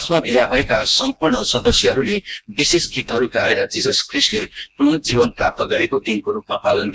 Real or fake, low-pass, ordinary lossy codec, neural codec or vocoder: fake; none; none; codec, 16 kHz, 1 kbps, FreqCodec, smaller model